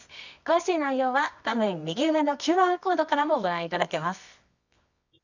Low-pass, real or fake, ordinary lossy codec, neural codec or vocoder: 7.2 kHz; fake; none; codec, 24 kHz, 0.9 kbps, WavTokenizer, medium music audio release